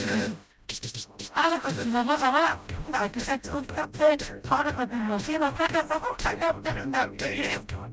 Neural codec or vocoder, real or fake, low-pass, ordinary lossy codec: codec, 16 kHz, 0.5 kbps, FreqCodec, smaller model; fake; none; none